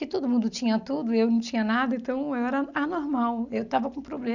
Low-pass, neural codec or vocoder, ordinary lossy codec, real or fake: 7.2 kHz; none; none; real